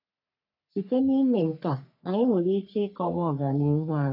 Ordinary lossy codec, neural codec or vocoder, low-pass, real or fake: none; codec, 44.1 kHz, 3.4 kbps, Pupu-Codec; 5.4 kHz; fake